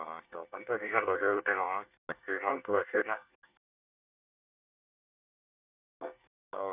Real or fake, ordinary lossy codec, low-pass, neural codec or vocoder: fake; none; 3.6 kHz; codec, 24 kHz, 1 kbps, SNAC